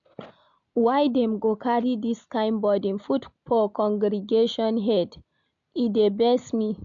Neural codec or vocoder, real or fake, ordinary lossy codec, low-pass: none; real; none; 7.2 kHz